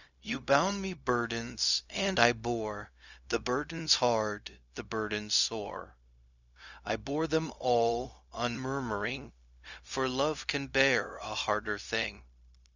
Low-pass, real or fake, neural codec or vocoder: 7.2 kHz; fake; codec, 16 kHz, 0.4 kbps, LongCat-Audio-Codec